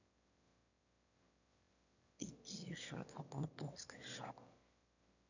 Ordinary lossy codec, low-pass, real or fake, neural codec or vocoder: none; 7.2 kHz; fake; autoencoder, 22.05 kHz, a latent of 192 numbers a frame, VITS, trained on one speaker